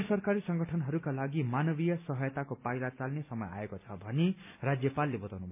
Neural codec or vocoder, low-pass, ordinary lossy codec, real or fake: none; 3.6 kHz; MP3, 32 kbps; real